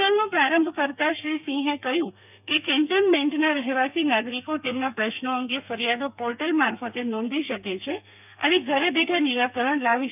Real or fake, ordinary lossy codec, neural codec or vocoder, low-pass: fake; none; codec, 32 kHz, 1.9 kbps, SNAC; 3.6 kHz